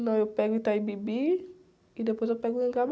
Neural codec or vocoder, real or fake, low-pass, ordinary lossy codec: none; real; none; none